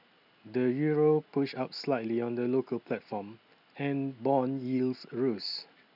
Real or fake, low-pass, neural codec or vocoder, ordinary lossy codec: real; 5.4 kHz; none; none